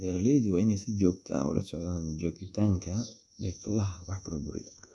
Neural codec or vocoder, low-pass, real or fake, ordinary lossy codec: codec, 24 kHz, 1.2 kbps, DualCodec; none; fake; none